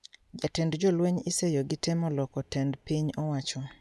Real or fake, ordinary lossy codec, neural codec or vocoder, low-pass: real; none; none; none